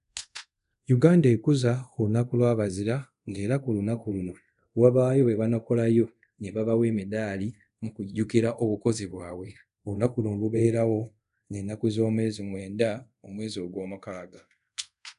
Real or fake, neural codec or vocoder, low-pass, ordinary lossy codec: fake; codec, 24 kHz, 0.5 kbps, DualCodec; 10.8 kHz; none